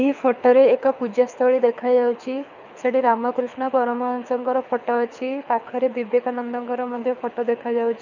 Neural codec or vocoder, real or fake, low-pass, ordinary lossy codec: codec, 24 kHz, 6 kbps, HILCodec; fake; 7.2 kHz; none